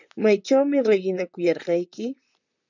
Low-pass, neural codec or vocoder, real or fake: 7.2 kHz; vocoder, 44.1 kHz, 128 mel bands, Pupu-Vocoder; fake